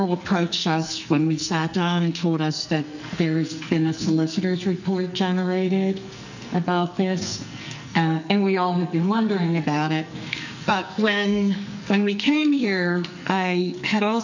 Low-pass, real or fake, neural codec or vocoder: 7.2 kHz; fake; codec, 44.1 kHz, 2.6 kbps, SNAC